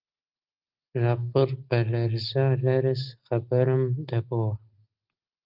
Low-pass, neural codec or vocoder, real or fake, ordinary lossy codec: 5.4 kHz; none; real; Opus, 32 kbps